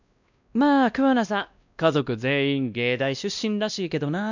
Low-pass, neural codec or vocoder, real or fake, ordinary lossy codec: 7.2 kHz; codec, 16 kHz, 1 kbps, X-Codec, WavLM features, trained on Multilingual LibriSpeech; fake; none